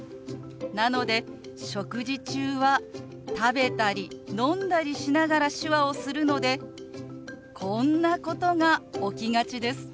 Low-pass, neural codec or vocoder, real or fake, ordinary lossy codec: none; none; real; none